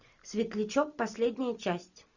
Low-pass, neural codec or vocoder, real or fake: 7.2 kHz; none; real